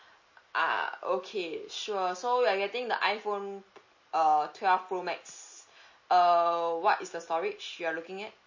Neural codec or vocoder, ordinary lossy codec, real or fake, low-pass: none; MP3, 32 kbps; real; 7.2 kHz